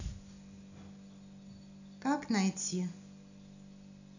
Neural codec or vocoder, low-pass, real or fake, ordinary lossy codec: none; 7.2 kHz; real; none